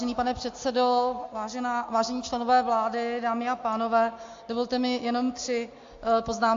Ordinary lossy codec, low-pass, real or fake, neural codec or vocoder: AAC, 48 kbps; 7.2 kHz; real; none